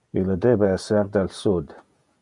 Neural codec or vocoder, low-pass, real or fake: vocoder, 48 kHz, 128 mel bands, Vocos; 10.8 kHz; fake